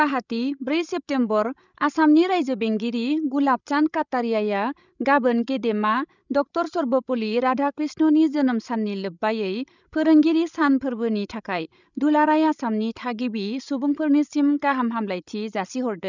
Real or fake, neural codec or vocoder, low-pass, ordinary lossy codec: fake; codec, 16 kHz, 16 kbps, FreqCodec, larger model; 7.2 kHz; none